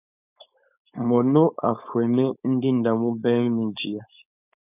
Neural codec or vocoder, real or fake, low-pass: codec, 16 kHz, 4.8 kbps, FACodec; fake; 3.6 kHz